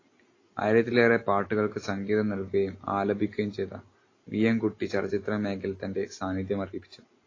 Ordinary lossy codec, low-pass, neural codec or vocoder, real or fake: AAC, 32 kbps; 7.2 kHz; none; real